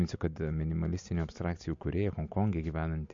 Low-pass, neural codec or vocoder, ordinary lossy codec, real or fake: 7.2 kHz; none; MP3, 48 kbps; real